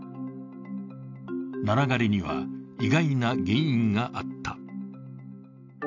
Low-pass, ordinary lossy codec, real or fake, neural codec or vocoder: 7.2 kHz; none; real; none